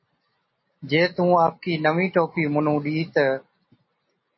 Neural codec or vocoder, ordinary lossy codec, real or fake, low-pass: none; MP3, 24 kbps; real; 7.2 kHz